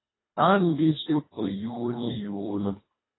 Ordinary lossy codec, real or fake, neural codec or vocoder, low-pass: AAC, 16 kbps; fake; codec, 24 kHz, 1.5 kbps, HILCodec; 7.2 kHz